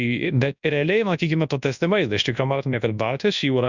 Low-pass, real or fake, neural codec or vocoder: 7.2 kHz; fake; codec, 24 kHz, 0.9 kbps, WavTokenizer, large speech release